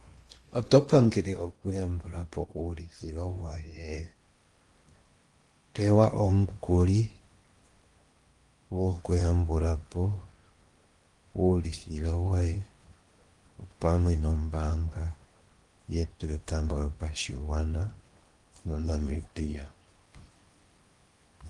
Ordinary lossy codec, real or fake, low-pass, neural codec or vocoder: Opus, 32 kbps; fake; 10.8 kHz; codec, 16 kHz in and 24 kHz out, 0.8 kbps, FocalCodec, streaming, 65536 codes